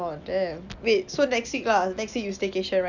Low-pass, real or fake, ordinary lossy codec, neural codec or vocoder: 7.2 kHz; real; none; none